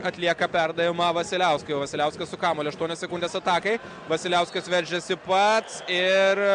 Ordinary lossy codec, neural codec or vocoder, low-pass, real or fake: Opus, 64 kbps; none; 9.9 kHz; real